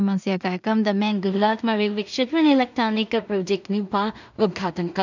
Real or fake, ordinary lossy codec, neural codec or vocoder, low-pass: fake; none; codec, 16 kHz in and 24 kHz out, 0.4 kbps, LongCat-Audio-Codec, two codebook decoder; 7.2 kHz